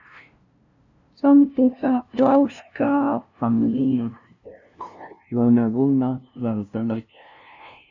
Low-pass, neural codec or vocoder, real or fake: 7.2 kHz; codec, 16 kHz, 0.5 kbps, FunCodec, trained on LibriTTS, 25 frames a second; fake